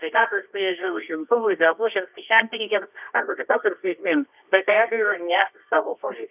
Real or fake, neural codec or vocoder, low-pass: fake; codec, 24 kHz, 0.9 kbps, WavTokenizer, medium music audio release; 3.6 kHz